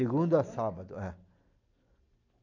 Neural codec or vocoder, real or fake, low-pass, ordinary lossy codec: none; real; 7.2 kHz; AAC, 48 kbps